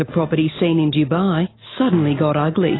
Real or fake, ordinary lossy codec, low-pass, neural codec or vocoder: real; AAC, 16 kbps; 7.2 kHz; none